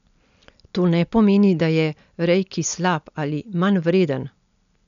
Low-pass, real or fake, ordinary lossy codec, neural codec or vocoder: 7.2 kHz; real; none; none